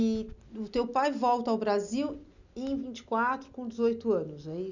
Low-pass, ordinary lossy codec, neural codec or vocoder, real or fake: 7.2 kHz; none; none; real